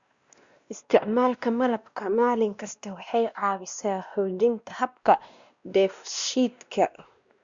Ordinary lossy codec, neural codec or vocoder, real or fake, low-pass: Opus, 64 kbps; codec, 16 kHz, 1 kbps, X-Codec, WavLM features, trained on Multilingual LibriSpeech; fake; 7.2 kHz